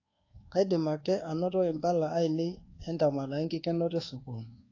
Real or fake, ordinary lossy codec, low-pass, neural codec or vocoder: fake; AAC, 32 kbps; 7.2 kHz; codec, 24 kHz, 1.2 kbps, DualCodec